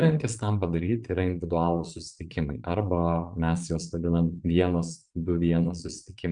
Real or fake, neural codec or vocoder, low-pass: fake; vocoder, 22.05 kHz, 80 mel bands, Vocos; 9.9 kHz